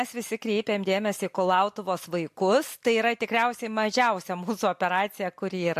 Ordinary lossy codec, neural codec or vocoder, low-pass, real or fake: MP3, 64 kbps; none; 14.4 kHz; real